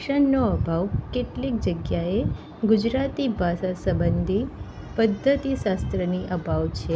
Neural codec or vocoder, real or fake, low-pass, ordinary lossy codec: none; real; none; none